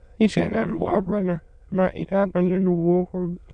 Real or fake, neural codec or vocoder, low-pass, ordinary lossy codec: fake; autoencoder, 22.05 kHz, a latent of 192 numbers a frame, VITS, trained on many speakers; 9.9 kHz; none